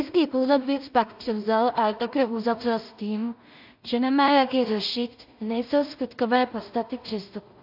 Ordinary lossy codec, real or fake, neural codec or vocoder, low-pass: AAC, 48 kbps; fake; codec, 16 kHz in and 24 kHz out, 0.4 kbps, LongCat-Audio-Codec, two codebook decoder; 5.4 kHz